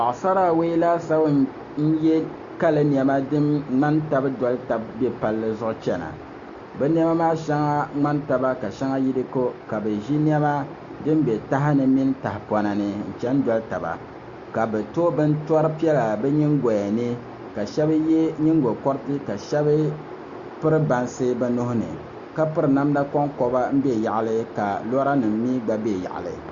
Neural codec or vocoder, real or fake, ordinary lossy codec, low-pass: none; real; Opus, 64 kbps; 7.2 kHz